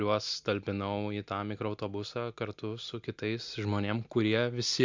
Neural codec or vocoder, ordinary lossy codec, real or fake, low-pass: none; MP3, 64 kbps; real; 7.2 kHz